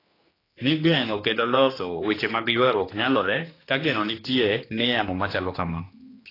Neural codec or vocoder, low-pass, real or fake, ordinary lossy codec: codec, 16 kHz, 2 kbps, X-Codec, HuBERT features, trained on general audio; 5.4 kHz; fake; AAC, 24 kbps